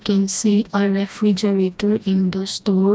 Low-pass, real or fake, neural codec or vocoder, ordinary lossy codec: none; fake; codec, 16 kHz, 1 kbps, FreqCodec, smaller model; none